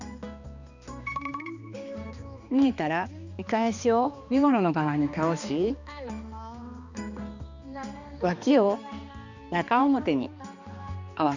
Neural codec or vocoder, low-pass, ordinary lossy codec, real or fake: codec, 16 kHz, 2 kbps, X-Codec, HuBERT features, trained on balanced general audio; 7.2 kHz; none; fake